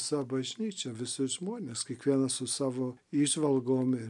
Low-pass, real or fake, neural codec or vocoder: 10.8 kHz; real; none